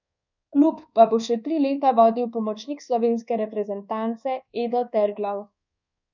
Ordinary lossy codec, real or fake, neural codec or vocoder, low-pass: none; fake; codec, 24 kHz, 1.2 kbps, DualCodec; 7.2 kHz